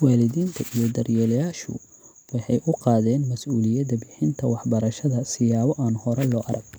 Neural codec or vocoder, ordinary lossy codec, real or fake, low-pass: none; none; real; none